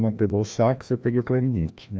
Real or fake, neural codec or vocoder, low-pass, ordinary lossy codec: fake; codec, 16 kHz, 1 kbps, FreqCodec, larger model; none; none